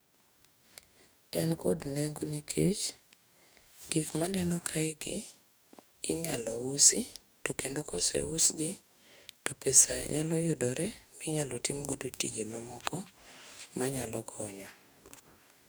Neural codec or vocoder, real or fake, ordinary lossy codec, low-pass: codec, 44.1 kHz, 2.6 kbps, DAC; fake; none; none